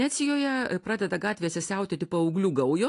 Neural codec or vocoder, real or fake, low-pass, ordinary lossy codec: none; real; 10.8 kHz; AAC, 48 kbps